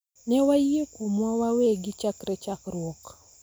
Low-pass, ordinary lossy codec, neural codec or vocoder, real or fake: none; none; none; real